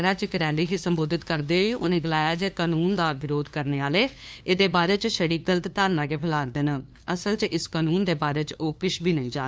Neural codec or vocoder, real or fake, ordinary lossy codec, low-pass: codec, 16 kHz, 2 kbps, FunCodec, trained on LibriTTS, 25 frames a second; fake; none; none